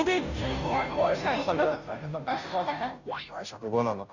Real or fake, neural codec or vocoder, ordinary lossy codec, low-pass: fake; codec, 16 kHz, 0.5 kbps, FunCodec, trained on Chinese and English, 25 frames a second; none; 7.2 kHz